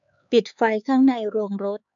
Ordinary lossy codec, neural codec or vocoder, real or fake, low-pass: none; codec, 16 kHz, 4 kbps, X-Codec, HuBERT features, trained on LibriSpeech; fake; 7.2 kHz